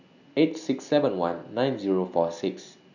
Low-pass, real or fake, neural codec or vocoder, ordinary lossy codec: 7.2 kHz; real; none; none